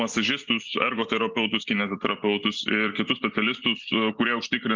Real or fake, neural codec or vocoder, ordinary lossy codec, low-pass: real; none; Opus, 24 kbps; 7.2 kHz